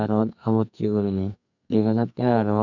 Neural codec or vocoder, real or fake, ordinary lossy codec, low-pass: codec, 44.1 kHz, 2.6 kbps, SNAC; fake; none; 7.2 kHz